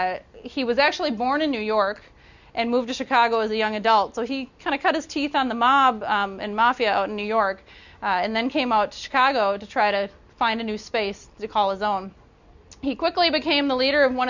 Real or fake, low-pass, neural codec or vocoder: real; 7.2 kHz; none